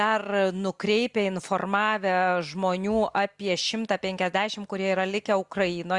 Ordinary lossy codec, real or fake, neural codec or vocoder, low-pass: Opus, 64 kbps; real; none; 10.8 kHz